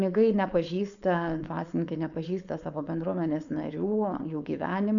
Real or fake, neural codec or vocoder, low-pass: fake; codec, 16 kHz, 4.8 kbps, FACodec; 7.2 kHz